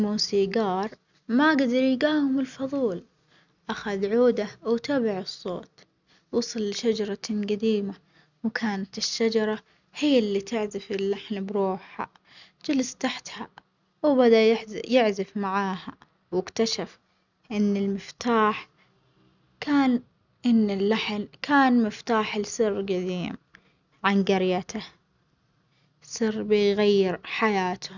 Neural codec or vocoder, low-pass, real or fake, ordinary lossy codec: none; 7.2 kHz; real; none